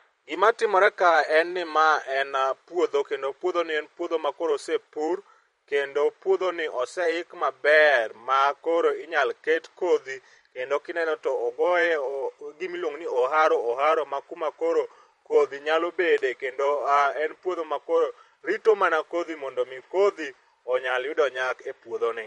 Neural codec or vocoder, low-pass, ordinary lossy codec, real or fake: vocoder, 44.1 kHz, 128 mel bands every 512 samples, BigVGAN v2; 19.8 kHz; MP3, 48 kbps; fake